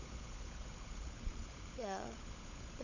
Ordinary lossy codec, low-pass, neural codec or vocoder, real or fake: none; 7.2 kHz; codec, 16 kHz, 16 kbps, FunCodec, trained on LibriTTS, 50 frames a second; fake